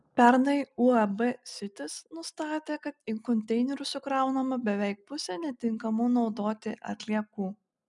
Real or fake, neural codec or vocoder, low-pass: real; none; 10.8 kHz